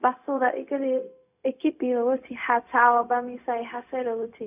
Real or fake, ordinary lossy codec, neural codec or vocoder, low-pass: fake; none; codec, 16 kHz, 0.4 kbps, LongCat-Audio-Codec; 3.6 kHz